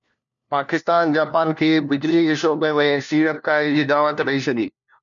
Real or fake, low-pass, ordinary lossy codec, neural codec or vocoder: fake; 7.2 kHz; MP3, 96 kbps; codec, 16 kHz, 1 kbps, FunCodec, trained on LibriTTS, 50 frames a second